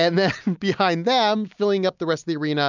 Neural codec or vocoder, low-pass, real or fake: none; 7.2 kHz; real